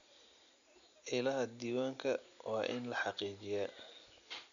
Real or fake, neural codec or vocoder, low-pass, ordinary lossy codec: real; none; 7.2 kHz; none